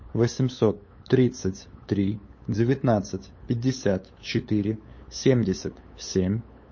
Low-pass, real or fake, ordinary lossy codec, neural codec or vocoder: 7.2 kHz; fake; MP3, 32 kbps; codec, 16 kHz, 8 kbps, FunCodec, trained on LibriTTS, 25 frames a second